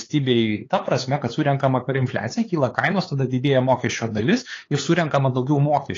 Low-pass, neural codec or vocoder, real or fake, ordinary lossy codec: 7.2 kHz; codec, 16 kHz, 4 kbps, X-Codec, HuBERT features, trained on LibriSpeech; fake; AAC, 32 kbps